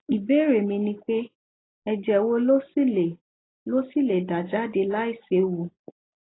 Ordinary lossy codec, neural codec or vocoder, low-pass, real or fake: AAC, 16 kbps; none; 7.2 kHz; real